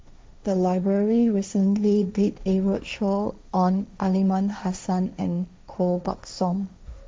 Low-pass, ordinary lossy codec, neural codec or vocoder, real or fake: none; none; codec, 16 kHz, 1.1 kbps, Voila-Tokenizer; fake